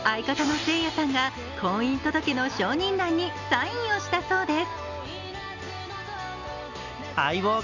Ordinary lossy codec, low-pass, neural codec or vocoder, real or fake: none; 7.2 kHz; none; real